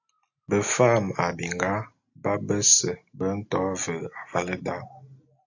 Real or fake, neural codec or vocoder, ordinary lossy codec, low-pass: real; none; AAC, 48 kbps; 7.2 kHz